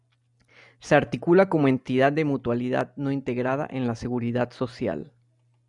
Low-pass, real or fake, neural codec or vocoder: 10.8 kHz; real; none